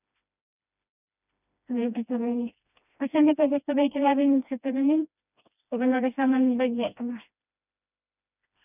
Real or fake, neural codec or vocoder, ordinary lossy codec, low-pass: fake; codec, 16 kHz, 1 kbps, FreqCodec, smaller model; none; 3.6 kHz